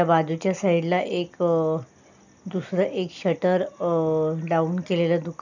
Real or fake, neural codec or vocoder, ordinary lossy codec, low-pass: real; none; none; 7.2 kHz